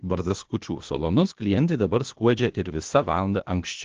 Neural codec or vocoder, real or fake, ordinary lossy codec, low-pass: codec, 16 kHz, 0.8 kbps, ZipCodec; fake; Opus, 16 kbps; 7.2 kHz